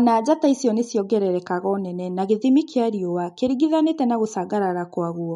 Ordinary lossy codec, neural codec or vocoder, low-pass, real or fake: MP3, 48 kbps; none; 19.8 kHz; real